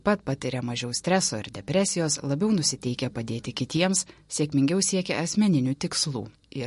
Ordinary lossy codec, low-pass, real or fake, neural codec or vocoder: MP3, 48 kbps; 14.4 kHz; real; none